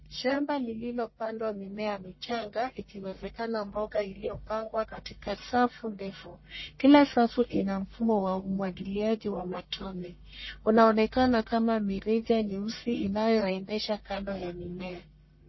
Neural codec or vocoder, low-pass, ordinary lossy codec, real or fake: codec, 44.1 kHz, 1.7 kbps, Pupu-Codec; 7.2 kHz; MP3, 24 kbps; fake